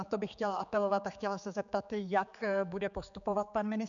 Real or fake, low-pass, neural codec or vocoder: fake; 7.2 kHz; codec, 16 kHz, 4 kbps, X-Codec, HuBERT features, trained on general audio